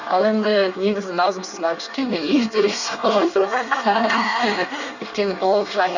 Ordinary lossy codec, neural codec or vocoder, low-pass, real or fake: none; codec, 24 kHz, 1 kbps, SNAC; 7.2 kHz; fake